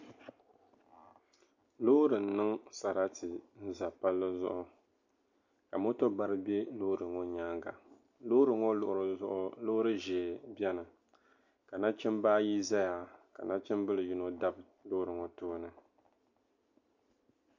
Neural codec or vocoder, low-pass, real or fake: none; 7.2 kHz; real